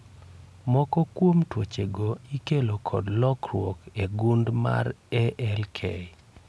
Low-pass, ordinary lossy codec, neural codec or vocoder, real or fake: none; none; none; real